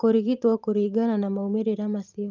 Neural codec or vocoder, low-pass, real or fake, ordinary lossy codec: vocoder, 44.1 kHz, 128 mel bands every 512 samples, BigVGAN v2; 7.2 kHz; fake; Opus, 24 kbps